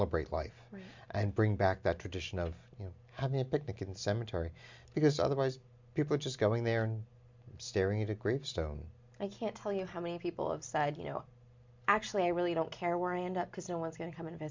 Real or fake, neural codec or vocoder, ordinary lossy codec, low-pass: real; none; MP3, 64 kbps; 7.2 kHz